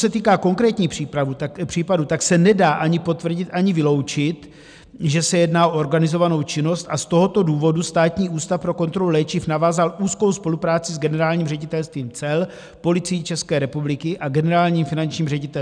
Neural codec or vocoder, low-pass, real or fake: none; 9.9 kHz; real